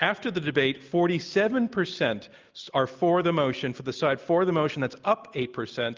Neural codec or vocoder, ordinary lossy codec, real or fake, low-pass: none; Opus, 32 kbps; real; 7.2 kHz